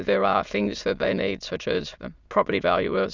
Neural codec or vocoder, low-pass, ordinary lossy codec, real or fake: autoencoder, 22.05 kHz, a latent of 192 numbers a frame, VITS, trained on many speakers; 7.2 kHz; Opus, 64 kbps; fake